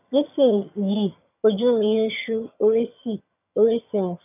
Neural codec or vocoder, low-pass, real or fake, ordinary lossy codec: vocoder, 22.05 kHz, 80 mel bands, HiFi-GAN; 3.6 kHz; fake; none